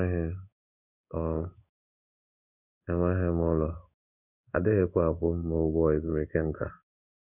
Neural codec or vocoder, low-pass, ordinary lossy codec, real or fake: codec, 16 kHz in and 24 kHz out, 1 kbps, XY-Tokenizer; 3.6 kHz; none; fake